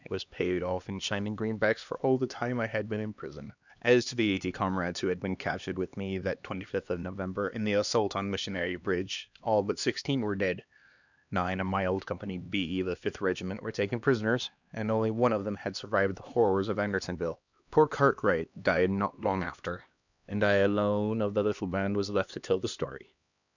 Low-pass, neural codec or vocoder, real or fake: 7.2 kHz; codec, 16 kHz, 2 kbps, X-Codec, HuBERT features, trained on LibriSpeech; fake